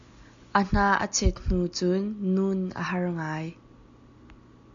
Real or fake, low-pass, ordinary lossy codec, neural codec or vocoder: real; 7.2 kHz; AAC, 64 kbps; none